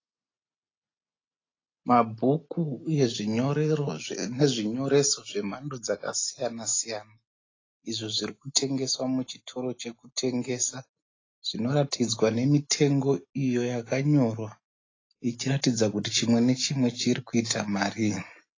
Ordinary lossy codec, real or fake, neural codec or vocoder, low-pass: AAC, 32 kbps; real; none; 7.2 kHz